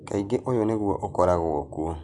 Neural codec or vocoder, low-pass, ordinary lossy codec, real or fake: none; 10.8 kHz; none; real